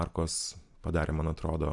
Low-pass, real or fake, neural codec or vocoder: 10.8 kHz; real; none